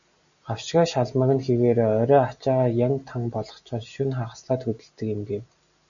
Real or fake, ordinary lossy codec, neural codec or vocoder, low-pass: real; MP3, 96 kbps; none; 7.2 kHz